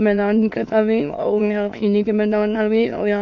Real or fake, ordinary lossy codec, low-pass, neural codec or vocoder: fake; MP3, 48 kbps; 7.2 kHz; autoencoder, 22.05 kHz, a latent of 192 numbers a frame, VITS, trained on many speakers